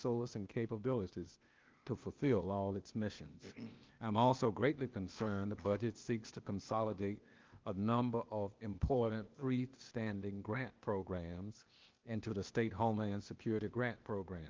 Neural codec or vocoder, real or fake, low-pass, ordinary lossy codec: codec, 16 kHz, 0.8 kbps, ZipCodec; fake; 7.2 kHz; Opus, 24 kbps